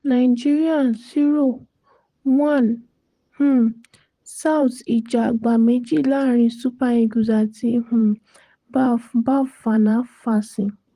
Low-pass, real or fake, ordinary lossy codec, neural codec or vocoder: 14.4 kHz; fake; Opus, 24 kbps; vocoder, 44.1 kHz, 128 mel bands, Pupu-Vocoder